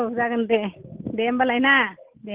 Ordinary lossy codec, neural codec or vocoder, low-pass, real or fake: Opus, 16 kbps; none; 3.6 kHz; real